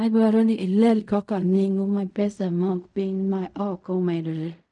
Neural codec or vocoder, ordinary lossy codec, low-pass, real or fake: codec, 16 kHz in and 24 kHz out, 0.4 kbps, LongCat-Audio-Codec, fine tuned four codebook decoder; none; 10.8 kHz; fake